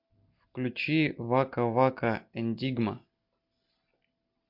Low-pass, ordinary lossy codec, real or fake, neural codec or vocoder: 5.4 kHz; MP3, 48 kbps; real; none